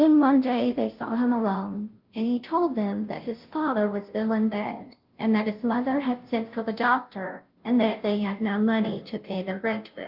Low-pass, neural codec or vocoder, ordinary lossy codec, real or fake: 5.4 kHz; codec, 16 kHz, 0.5 kbps, FunCodec, trained on Chinese and English, 25 frames a second; Opus, 32 kbps; fake